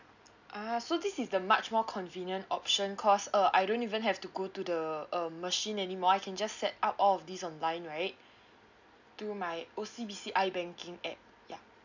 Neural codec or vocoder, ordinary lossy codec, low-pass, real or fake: none; none; 7.2 kHz; real